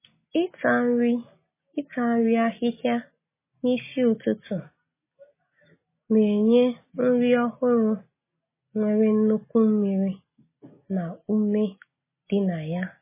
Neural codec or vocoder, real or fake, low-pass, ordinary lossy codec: none; real; 3.6 kHz; MP3, 16 kbps